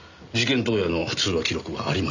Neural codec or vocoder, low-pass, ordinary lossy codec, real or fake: none; 7.2 kHz; none; real